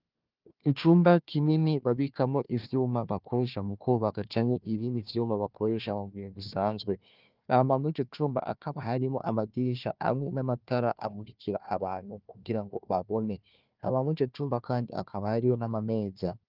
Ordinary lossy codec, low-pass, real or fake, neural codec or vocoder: Opus, 24 kbps; 5.4 kHz; fake; codec, 16 kHz, 1 kbps, FunCodec, trained on Chinese and English, 50 frames a second